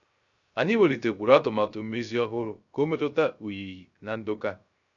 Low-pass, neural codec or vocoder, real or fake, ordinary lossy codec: 7.2 kHz; codec, 16 kHz, 0.3 kbps, FocalCodec; fake; none